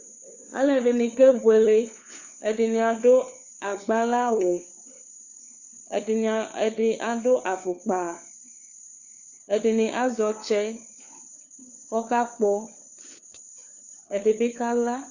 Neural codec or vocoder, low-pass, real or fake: codec, 16 kHz, 4 kbps, FunCodec, trained on LibriTTS, 50 frames a second; 7.2 kHz; fake